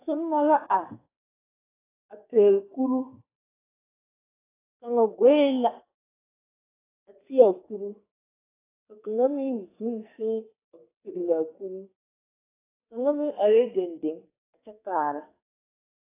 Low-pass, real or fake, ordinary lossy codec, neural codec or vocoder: 3.6 kHz; fake; AAC, 16 kbps; codec, 44.1 kHz, 2.6 kbps, SNAC